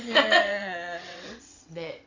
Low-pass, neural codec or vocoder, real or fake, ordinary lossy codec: 7.2 kHz; vocoder, 22.05 kHz, 80 mel bands, WaveNeXt; fake; AAC, 48 kbps